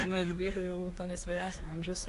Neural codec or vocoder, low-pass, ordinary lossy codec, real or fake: codec, 24 kHz, 1 kbps, SNAC; 10.8 kHz; AAC, 48 kbps; fake